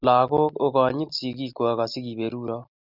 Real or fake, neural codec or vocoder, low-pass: real; none; 5.4 kHz